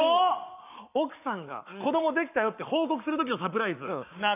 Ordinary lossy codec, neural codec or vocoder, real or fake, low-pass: none; codec, 44.1 kHz, 7.8 kbps, Pupu-Codec; fake; 3.6 kHz